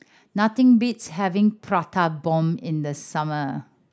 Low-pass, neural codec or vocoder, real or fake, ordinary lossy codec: none; none; real; none